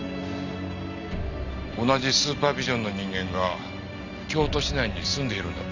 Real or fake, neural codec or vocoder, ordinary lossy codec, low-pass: real; none; none; 7.2 kHz